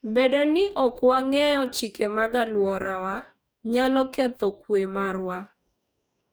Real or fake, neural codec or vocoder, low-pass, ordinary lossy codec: fake; codec, 44.1 kHz, 2.6 kbps, DAC; none; none